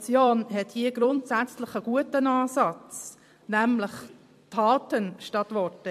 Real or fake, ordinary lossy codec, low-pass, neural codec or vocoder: fake; MP3, 64 kbps; 14.4 kHz; vocoder, 44.1 kHz, 128 mel bands every 256 samples, BigVGAN v2